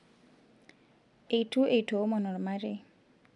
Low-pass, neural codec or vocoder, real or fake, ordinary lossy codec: 10.8 kHz; none; real; none